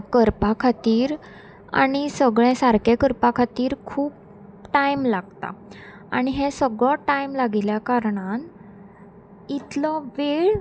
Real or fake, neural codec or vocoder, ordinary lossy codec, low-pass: real; none; none; none